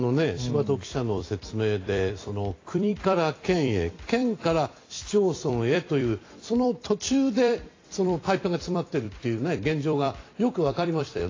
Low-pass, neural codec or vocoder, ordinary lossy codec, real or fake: 7.2 kHz; vocoder, 44.1 kHz, 128 mel bands every 256 samples, BigVGAN v2; AAC, 32 kbps; fake